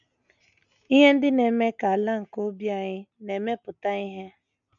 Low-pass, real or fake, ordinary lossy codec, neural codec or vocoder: 7.2 kHz; real; none; none